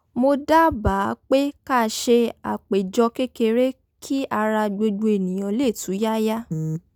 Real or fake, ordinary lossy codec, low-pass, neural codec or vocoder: real; none; 19.8 kHz; none